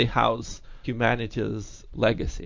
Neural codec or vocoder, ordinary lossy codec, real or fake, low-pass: none; MP3, 48 kbps; real; 7.2 kHz